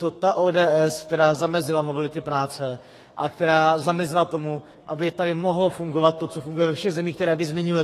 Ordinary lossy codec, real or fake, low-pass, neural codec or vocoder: AAC, 48 kbps; fake; 14.4 kHz; codec, 32 kHz, 1.9 kbps, SNAC